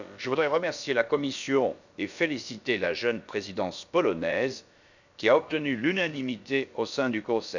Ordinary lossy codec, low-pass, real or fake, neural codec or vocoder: none; 7.2 kHz; fake; codec, 16 kHz, about 1 kbps, DyCAST, with the encoder's durations